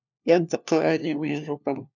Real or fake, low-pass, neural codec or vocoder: fake; 7.2 kHz; codec, 16 kHz, 1 kbps, FunCodec, trained on LibriTTS, 50 frames a second